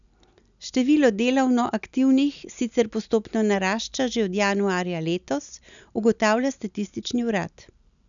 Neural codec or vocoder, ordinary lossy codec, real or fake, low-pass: none; none; real; 7.2 kHz